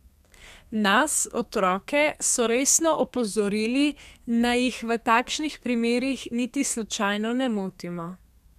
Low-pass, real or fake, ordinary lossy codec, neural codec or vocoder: 14.4 kHz; fake; none; codec, 32 kHz, 1.9 kbps, SNAC